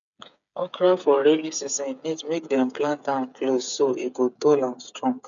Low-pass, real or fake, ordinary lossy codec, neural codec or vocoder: 7.2 kHz; fake; MP3, 96 kbps; codec, 16 kHz, 8 kbps, FreqCodec, smaller model